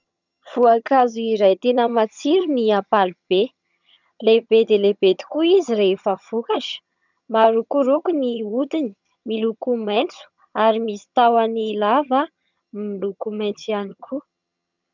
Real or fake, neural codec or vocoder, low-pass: fake; vocoder, 22.05 kHz, 80 mel bands, HiFi-GAN; 7.2 kHz